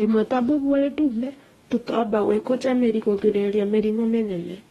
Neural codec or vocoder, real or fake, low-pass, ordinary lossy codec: codec, 44.1 kHz, 2.6 kbps, DAC; fake; 19.8 kHz; AAC, 32 kbps